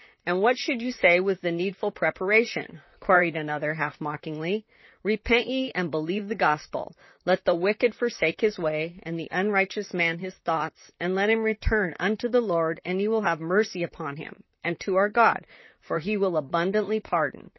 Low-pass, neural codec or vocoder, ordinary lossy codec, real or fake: 7.2 kHz; vocoder, 44.1 kHz, 128 mel bands, Pupu-Vocoder; MP3, 24 kbps; fake